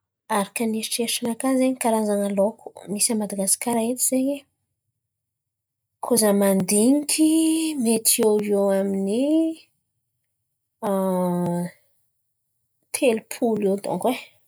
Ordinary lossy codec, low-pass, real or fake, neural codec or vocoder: none; none; real; none